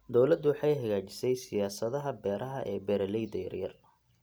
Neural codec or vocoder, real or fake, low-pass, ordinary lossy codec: none; real; none; none